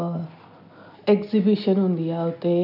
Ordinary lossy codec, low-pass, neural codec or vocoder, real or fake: none; 5.4 kHz; none; real